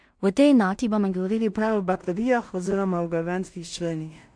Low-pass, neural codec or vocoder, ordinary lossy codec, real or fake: 9.9 kHz; codec, 16 kHz in and 24 kHz out, 0.4 kbps, LongCat-Audio-Codec, two codebook decoder; MP3, 64 kbps; fake